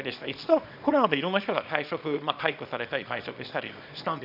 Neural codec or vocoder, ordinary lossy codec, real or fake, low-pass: codec, 24 kHz, 0.9 kbps, WavTokenizer, small release; none; fake; 5.4 kHz